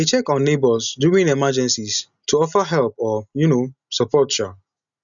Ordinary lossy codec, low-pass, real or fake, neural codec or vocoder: none; 7.2 kHz; real; none